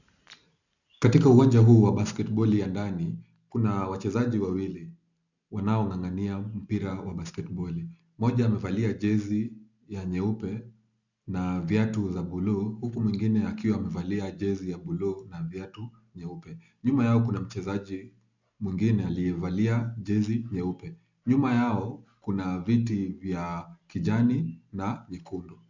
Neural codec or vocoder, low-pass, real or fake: none; 7.2 kHz; real